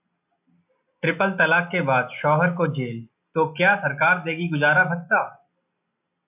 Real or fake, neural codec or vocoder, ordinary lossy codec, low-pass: real; none; AAC, 32 kbps; 3.6 kHz